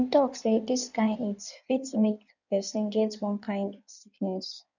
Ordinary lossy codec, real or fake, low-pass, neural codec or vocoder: none; fake; 7.2 kHz; codec, 16 kHz in and 24 kHz out, 1.1 kbps, FireRedTTS-2 codec